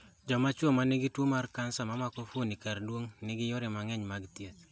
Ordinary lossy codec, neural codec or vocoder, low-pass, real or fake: none; none; none; real